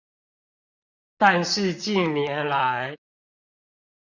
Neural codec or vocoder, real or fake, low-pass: vocoder, 44.1 kHz, 128 mel bands, Pupu-Vocoder; fake; 7.2 kHz